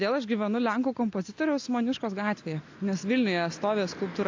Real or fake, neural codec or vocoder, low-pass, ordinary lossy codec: real; none; 7.2 kHz; AAC, 48 kbps